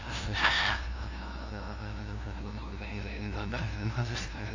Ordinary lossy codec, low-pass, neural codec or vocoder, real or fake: AAC, 48 kbps; 7.2 kHz; codec, 16 kHz, 0.5 kbps, FunCodec, trained on LibriTTS, 25 frames a second; fake